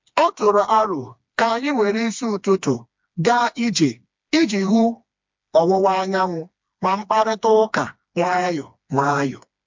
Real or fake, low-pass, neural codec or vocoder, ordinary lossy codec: fake; 7.2 kHz; codec, 16 kHz, 2 kbps, FreqCodec, smaller model; MP3, 64 kbps